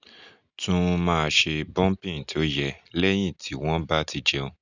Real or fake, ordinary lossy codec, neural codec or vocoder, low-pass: real; none; none; 7.2 kHz